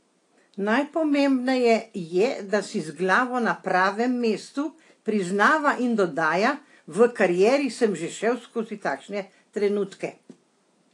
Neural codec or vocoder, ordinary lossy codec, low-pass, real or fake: none; AAC, 48 kbps; 10.8 kHz; real